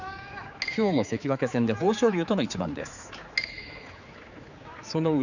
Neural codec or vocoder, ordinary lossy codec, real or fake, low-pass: codec, 16 kHz, 4 kbps, X-Codec, HuBERT features, trained on general audio; none; fake; 7.2 kHz